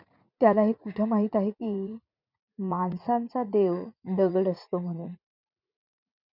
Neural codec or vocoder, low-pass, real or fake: vocoder, 44.1 kHz, 80 mel bands, Vocos; 5.4 kHz; fake